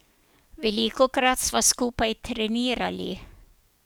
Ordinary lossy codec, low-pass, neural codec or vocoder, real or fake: none; none; codec, 44.1 kHz, 7.8 kbps, DAC; fake